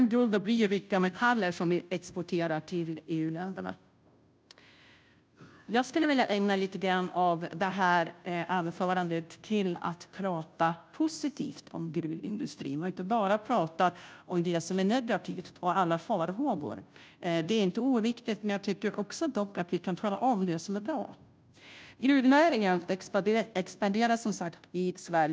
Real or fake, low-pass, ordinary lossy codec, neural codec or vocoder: fake; none; none; codec, 16 kHz, 0.5 kbps, FunCodec, trained on Chinese and English, 25 frames a second